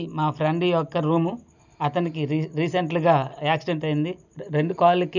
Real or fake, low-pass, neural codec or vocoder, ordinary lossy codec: real; 7.2 kHz; none; none